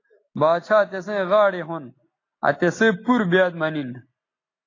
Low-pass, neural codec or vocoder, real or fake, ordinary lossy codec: 7.2 kHz; none; real; AAC, 32 kbps